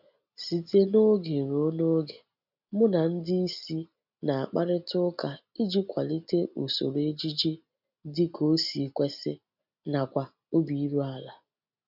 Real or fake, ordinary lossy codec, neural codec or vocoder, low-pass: real; none; none; 5.4 kHz